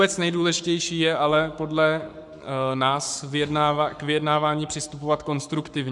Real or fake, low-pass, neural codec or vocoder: fake; 10.8 kHz; codec, 44.1 kHz, 7.8 kbps, Pupu-Codec